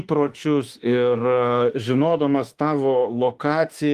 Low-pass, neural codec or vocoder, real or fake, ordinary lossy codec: 14.4 kHz; autoencoder, 48 kHz, 32 numbers a frame, DAC-VAE, trained on Japanese speech; fake; Opus, 24 kbps